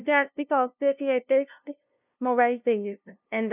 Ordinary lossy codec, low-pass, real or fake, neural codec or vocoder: none; 3.6 kHz; fake; codec, 16 kHz, 0.5 kbps, FunCodec, trained on LibriTTS, 25 frames a second